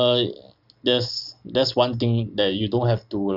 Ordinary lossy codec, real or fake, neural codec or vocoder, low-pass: none; real; none; 5.4 kHz